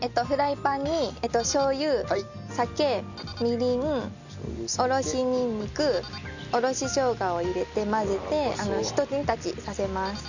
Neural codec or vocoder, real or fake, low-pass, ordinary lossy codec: none; real; 7.2 kHz; none